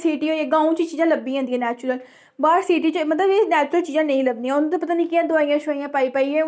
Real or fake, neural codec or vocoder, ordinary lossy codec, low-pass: real; none; none; none